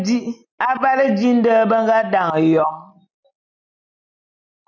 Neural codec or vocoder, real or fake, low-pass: none; real; 7.2 kHz